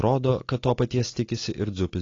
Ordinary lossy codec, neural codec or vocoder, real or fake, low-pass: AAC, 32 kbps; none; real; 7.2 kHz